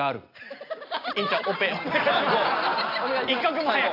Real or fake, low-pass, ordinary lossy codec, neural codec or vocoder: real; 5.4 kHz; AAC, 32 kbps; none